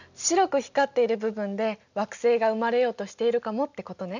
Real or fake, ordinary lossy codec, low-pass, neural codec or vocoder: real; none; 7.2 kHz; none